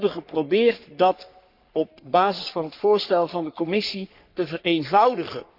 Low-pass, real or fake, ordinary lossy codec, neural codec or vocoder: 5.4 kHz; fake; none; codec, 44.1 kHz, 3.4 kbps, Pupu-Codec